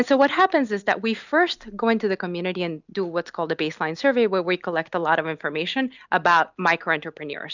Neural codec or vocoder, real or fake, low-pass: none; real; 7.2 kHz